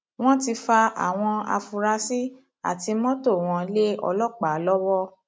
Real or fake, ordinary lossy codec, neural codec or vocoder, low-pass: real; none; none; none